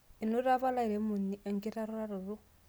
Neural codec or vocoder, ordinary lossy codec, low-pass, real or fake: none; none; none; real